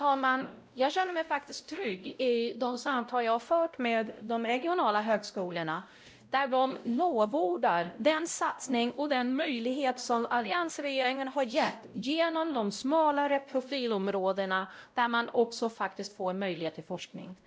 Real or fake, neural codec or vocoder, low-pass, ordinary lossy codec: fake; codec, 16 kHz, 0.5 kbps, X-Codec, WavLM features, trained on Multilingual LibriSpeech; none; none